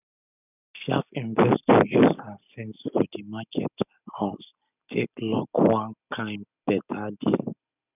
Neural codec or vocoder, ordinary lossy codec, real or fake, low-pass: none; none; real; 3.6 kHz